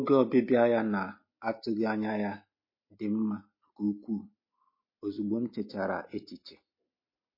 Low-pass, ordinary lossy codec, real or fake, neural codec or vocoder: 5.4 kHz; MP3, 24 kbps; real; none